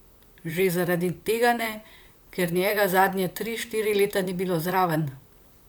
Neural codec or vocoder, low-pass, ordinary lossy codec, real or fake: vocoder, 44.1 kHz, 128 mel bands, Pupu-Vocoder; none; none; fake